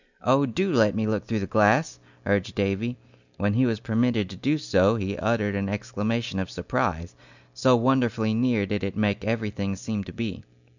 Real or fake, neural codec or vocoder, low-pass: real; none; 7.2 kHz